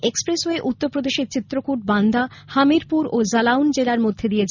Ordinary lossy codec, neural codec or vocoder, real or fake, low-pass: none; none; real; 7.2 kHz